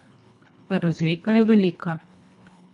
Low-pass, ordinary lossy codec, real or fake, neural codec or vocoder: 10.8 kHz; none; fake; codec, 24 kHz, 1.5 kbps, HILCodec